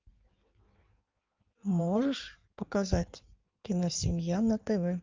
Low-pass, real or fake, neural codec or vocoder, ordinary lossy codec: 7.2 kHz; fake; codec, 16 kHz in and 24 kHz out, 1.1 kbps, FireRedTTS-2 codec; Opus, 24 kbps